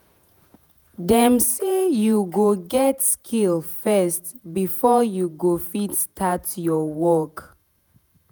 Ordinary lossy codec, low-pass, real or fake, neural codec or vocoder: none; none; fake; vocoder, 48 kHz, 128 mel bands, Vocos